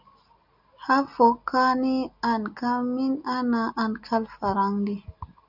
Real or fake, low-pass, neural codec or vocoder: real; 5.4 kHz; none